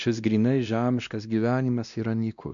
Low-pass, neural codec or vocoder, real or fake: 7.2 kHz; codec, 16 kHz, 1 kbps, X-Codec, WavLM features, trained on Multilingual LibriSpeech; fake